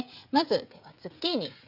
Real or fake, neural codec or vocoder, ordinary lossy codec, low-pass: fake; codec, 16 kHz, 16 kbps, FreqCodec, smaller model; none; 5.4 kHz